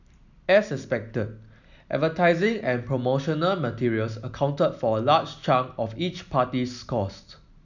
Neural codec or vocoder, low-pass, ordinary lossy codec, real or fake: none; 7.2 kHz; none; real